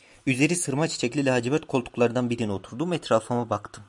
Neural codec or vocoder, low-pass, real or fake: none; 10.8 kHz; real